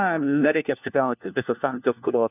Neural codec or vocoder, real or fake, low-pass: codec, 16 kHz, 1 kbps, FunCodec, trained on LibriTTS, 50 frames a second; fake; 3.6 kHz